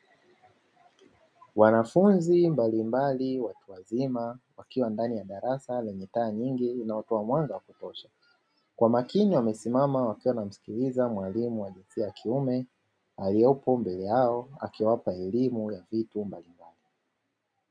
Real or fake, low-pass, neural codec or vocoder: real; 9.9 kHz; none